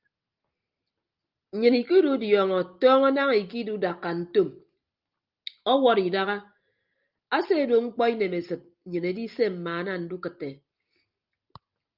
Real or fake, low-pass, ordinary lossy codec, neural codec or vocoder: real; 5.4 kHz; Opus, 24 kbps; none